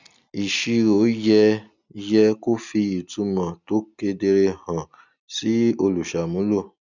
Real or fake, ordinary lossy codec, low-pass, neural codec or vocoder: real; none; 7.2 kHz; none